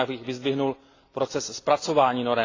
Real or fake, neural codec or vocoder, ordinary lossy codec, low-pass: real; none; AAC, 32 kbps; 7.2 kHz